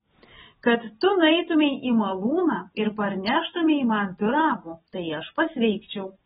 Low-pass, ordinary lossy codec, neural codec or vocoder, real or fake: 7.2 kHz; AAC, 16 kbps; none; real